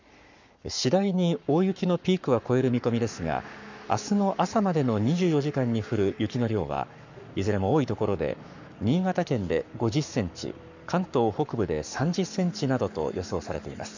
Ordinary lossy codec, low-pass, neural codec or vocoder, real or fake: none; 7.2 kHz; codec, 44.1 kHz, 7.8 kbps, Pupu-Codec; fake